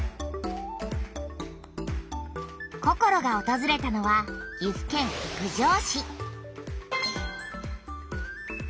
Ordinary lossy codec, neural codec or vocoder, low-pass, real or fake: none; none; none; real